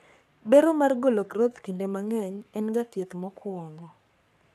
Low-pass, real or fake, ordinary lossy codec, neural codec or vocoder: 14.4 kHz; fake; none; codec, 44.1 kHz, 3.4 kbps, Pupu-Codec